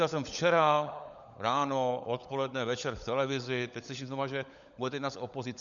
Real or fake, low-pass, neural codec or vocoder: fake; 7.2 kHz; codec, 16 kHz, 16 kbps, FunCodec, trained on LibriTTS, 50 frames a second